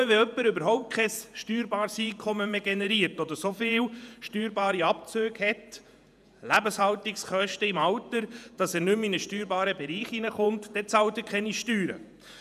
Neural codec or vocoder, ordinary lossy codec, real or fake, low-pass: vocoder, 48 kHz, 128 mel bands, Vocos; none; fake; 14.4 kHz